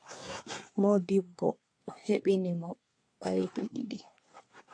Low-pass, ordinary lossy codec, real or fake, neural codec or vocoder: 9.9 kHz; AAC, 48 kbps; fake; codec, 24 kHz, 1 kbps, SNAC